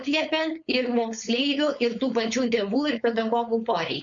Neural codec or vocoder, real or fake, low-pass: codec, 16 kHz, 4.8 kbps, FACodec; fake; 7.2 kHz